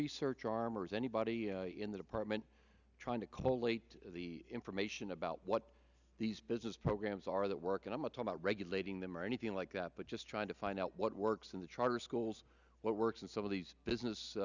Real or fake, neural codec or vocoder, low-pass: real; none; 7.2 kHz